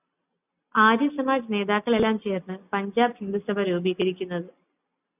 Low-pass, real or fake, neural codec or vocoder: 3.6 kHz; real; none